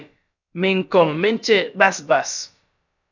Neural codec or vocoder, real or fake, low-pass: codec, 16 kHz, about 1 kbps, DyCAST, with the encoder's durations; fake; 7.2 kHz